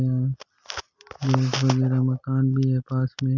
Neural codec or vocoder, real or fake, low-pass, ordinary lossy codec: none; real; 7.2 kHz; none